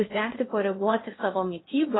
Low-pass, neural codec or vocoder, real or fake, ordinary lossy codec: 7.2 kHz; codec, 16 kHz in and 24 kHz out, 0.6 kbps, FocalCodec, streaming, 2048 codes; fake; AAC, 16 kbps